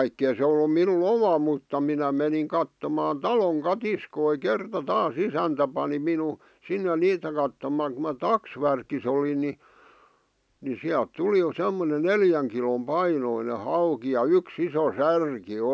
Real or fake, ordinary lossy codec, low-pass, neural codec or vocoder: real; none; none; none